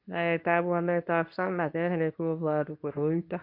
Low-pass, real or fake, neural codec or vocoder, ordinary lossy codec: 5.4 kHz; fake; codec, 24 kHz, 0.9 kbps, WavTokenizer, medium speech release version 2; AAC, 48 kbps